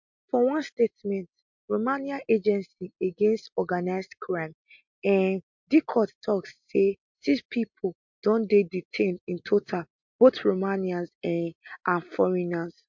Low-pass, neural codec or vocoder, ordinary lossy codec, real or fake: 7.2 kHz; none; MP3, 48 kbps; real